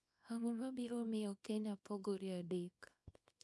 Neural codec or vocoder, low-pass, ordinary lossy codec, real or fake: codec, 24 kHz, 0.9 kbps, WavTokenizer, small release; none; none; fake